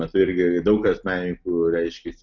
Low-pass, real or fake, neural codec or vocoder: 7.2 kHz; real; none